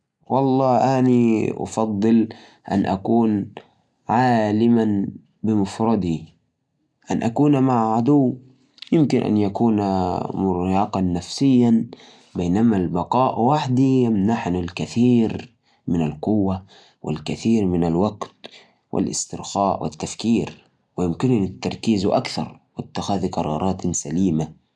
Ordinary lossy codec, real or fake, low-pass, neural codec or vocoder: none; real; none; none